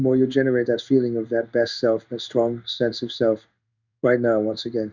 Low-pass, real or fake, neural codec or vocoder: 7.2 kHz; fake; codec, 16 kHz in and 24 kHz out, 1 kbps, XY-Tokenizer